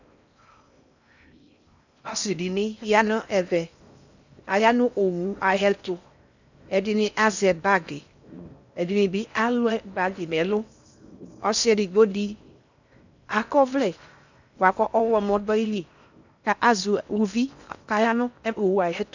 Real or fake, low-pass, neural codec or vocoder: fake; 7.2 kHz; codec, 16 kHz in and 24 kHz out, 0.6 kbps, FocalCodec, streaming, 4096 codes